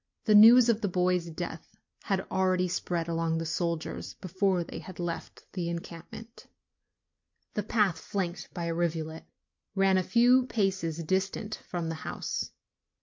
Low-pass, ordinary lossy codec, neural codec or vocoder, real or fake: 7.2 kHz; MP3, 48 kbps; none; real